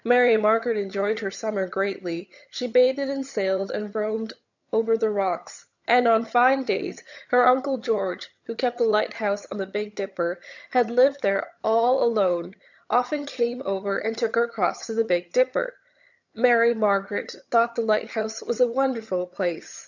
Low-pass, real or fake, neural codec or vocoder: 7.2 kHz; fake; vocoder, 22.05 kHz, 80 mel bands, HiFi-GAN